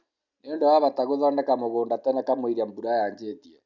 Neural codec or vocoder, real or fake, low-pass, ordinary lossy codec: none; real; 7.2 kHz; none